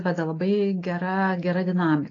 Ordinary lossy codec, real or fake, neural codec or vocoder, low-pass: AAC, 32 kbps; real; none; 7.2 kHz